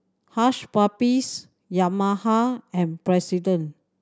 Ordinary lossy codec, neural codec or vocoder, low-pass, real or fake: none; none; none; real